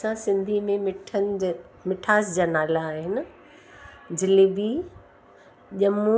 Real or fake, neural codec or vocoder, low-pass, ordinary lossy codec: real; none; none; none